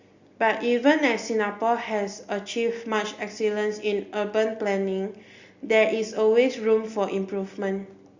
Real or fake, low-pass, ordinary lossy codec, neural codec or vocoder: real; 7.2 kHz; Opus, 64 kbps; none